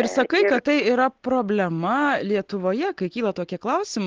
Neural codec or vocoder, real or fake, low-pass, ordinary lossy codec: none; real; 7.2 kHz; Opus, 16 kbps